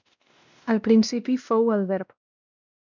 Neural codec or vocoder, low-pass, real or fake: codec, 16 kHz, 1 kbps, X-Codec, WavLM features, trained on Multilingual LibriSpeech; 7.2 kHz; fake